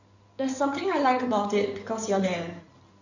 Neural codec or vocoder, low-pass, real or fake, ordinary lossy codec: codec, 16 kHz in and 24 kHz out, 2.2 kbps, FireRedTTS-2 codec; 7.2 kHz; fake; none